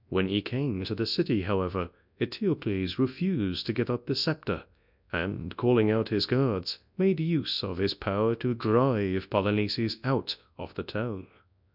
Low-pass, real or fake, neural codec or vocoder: 5.4 kHz; fake; codec, 24 kHz, 0.9 kbps, WavTokenizer, large speech release